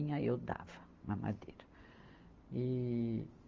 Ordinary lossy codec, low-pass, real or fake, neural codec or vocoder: Opus, 32 kbps; 7.2 kHz; real; none